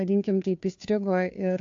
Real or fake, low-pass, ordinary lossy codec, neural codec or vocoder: fake; 7.2 kHz; AAC, 48 kbps; codec, 16 kHz, 2 kbps, FunCodec, trained on Chinese and English, 25 frames a second